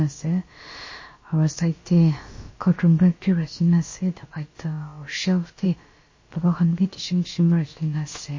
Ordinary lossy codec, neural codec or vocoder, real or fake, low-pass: MP3, 32 kbps; codec, 16 kHz, about 1 kbps, DyCAST, with the encoder's durations; fake; 7.2 kHz